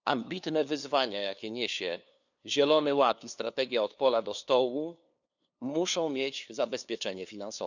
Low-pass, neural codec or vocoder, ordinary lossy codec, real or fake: 7.2 kHz; codec, 16 kHz, 2 kbps, FunCodec, trained on LibriTTS, 25 frames a second; none; fake